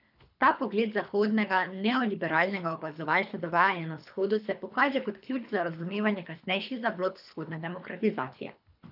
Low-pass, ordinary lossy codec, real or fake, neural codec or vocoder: 5.4 kHz; none; fake; codec, 24 kHz, 3 kbps, HILCodec